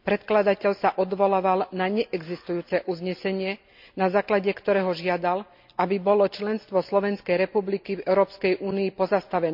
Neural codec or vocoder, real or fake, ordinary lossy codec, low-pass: none; real; MP3, 48 kbps; 5.4 kHz